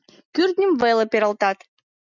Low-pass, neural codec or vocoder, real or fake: 7.2 kHz; none; real